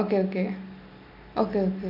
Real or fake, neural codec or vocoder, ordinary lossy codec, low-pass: real; none; none; 5.4 kHz